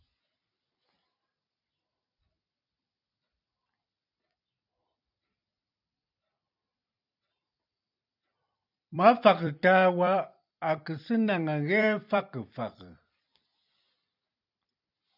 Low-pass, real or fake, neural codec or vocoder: 5.4 kHz; fake; vocoder, 44.1 kHz, 128 mel bands every 256 samples, BigVGAN v2